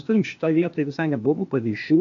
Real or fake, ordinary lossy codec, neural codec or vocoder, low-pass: fake; AAC, 48 kbps; codec, 16 kHz, 0.8 kbps, ZipCodec; 7.2 kHz